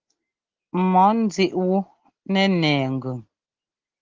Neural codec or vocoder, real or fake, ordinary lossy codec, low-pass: none; real; Opus, 16 kbps; 7.2 kHz